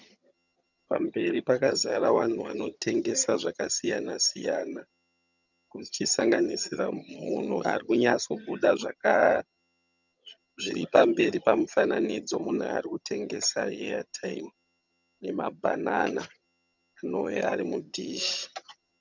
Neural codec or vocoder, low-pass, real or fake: vocoder, 22.05 kHz, 80 mel bands, HiFi-GAN; 7.2 kHz; fake